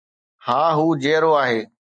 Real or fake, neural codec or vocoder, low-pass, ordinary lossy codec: real; none; 9.9 kHz; MP3, 64 kbps